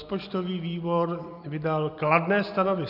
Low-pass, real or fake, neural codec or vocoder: 5.4 kHz; real; none